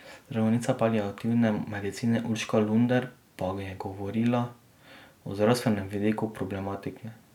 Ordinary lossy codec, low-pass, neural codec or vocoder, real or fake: none; 19.8 kHz; none; real